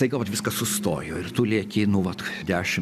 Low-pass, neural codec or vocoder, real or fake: 14.4 kHz; none; real